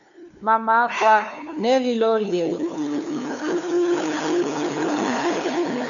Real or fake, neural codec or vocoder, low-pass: fake; codec, 16 kHz, 2 kbps, FunCodec, trained on LibriTTS, 25 frames a second; 7.2 kHz